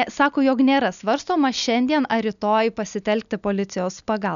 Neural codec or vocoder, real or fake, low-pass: none; real; 7.2 kHz